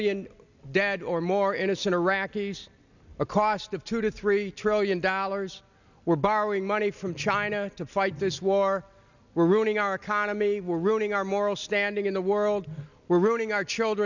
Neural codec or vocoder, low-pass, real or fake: none; 7.2 kHz; real